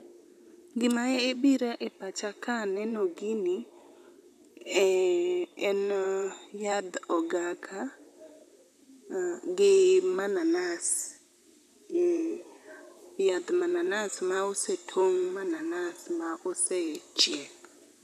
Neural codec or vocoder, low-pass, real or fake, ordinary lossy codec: vocoder, 44.1 kHz, 128 mel bands, Pupu-Vocoder; 14.4 kHz; fake; none